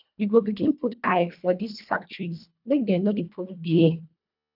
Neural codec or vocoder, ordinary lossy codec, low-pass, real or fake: codec, 24 kHz, 1.5 kbps, HILCodec; none; 5.4 kHz; fake